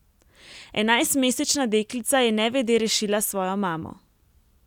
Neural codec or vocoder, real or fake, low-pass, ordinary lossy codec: none; real; 19.8 kHz; none